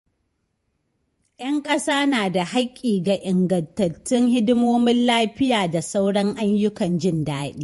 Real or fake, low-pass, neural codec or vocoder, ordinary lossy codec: fake; 14.4 kHz; vocoder, 48 kHz, 128 mel bands, Vocos; MP3, 48 kbps